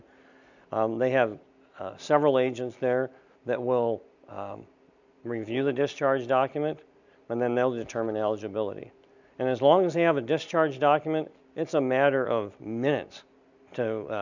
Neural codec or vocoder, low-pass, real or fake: none; 7.2 kHz; real